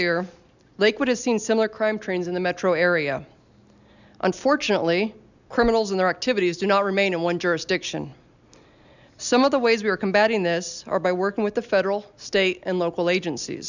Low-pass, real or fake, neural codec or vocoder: 7.2 kHz; real; none